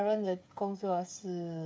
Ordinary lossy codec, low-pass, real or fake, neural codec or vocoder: none; none; fake; codec, 16 kHz, 8 kbps, FreqCodec, smaller model